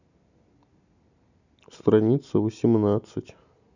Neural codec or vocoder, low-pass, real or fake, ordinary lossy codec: none; 7.2 kHz; real; none